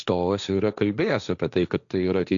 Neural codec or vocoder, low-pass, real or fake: codec, 16 kHz, 1.1 kbps, Voila-Tokenizer; 7.2 kHz; fake